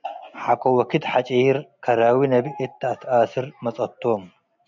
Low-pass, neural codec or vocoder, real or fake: 7.2 kHz; none; real